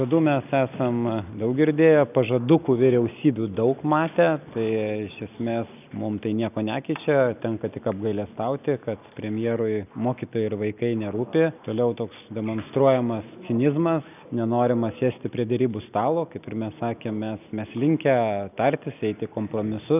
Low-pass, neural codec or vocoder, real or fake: 3.6 kHz; none; real